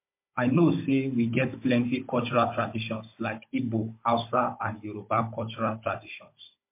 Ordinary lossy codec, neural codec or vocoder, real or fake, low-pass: MP3, 24 kbps; codec, 16 kHz, 16 kbps, FunCodec, trained on Chinese and English, 50 frames a second; fake; 3.6 kHz